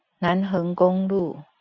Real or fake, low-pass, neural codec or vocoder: real; 7.2 kHz; none